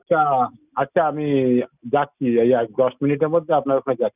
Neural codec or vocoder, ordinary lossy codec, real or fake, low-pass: none; none; real; 3.6 kHz